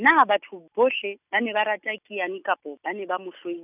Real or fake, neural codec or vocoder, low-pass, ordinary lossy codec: real; none; 3.6 kHz; none